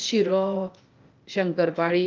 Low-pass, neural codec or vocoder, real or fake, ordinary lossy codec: 7.2 kHz; codec, 16 kHz, 0.8 kbps, ZipCodec; fake; Opus, 24 kbps